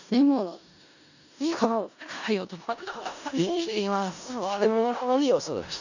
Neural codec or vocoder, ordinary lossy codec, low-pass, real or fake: codec, 16 kHz in and 24 kHz out, 0.4 kbps, LongCat-Audio-Codec, four codebook decoder; none; 7.2 kHz; fake